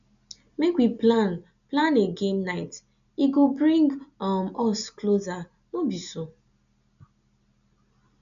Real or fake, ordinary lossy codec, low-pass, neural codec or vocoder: real; none; 7.2 kHz; none